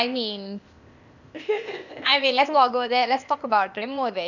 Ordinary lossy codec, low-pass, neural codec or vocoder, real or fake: none; 7.2 kHz; codec, 16 kHz, 0.8 kbps, ZipCodec; fake